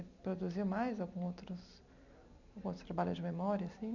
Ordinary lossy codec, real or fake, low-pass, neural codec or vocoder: none; fake; 7.2 kHz; vocoder, 44.1 kHz, 128 mel bands every 256 samples, BigVGAN v2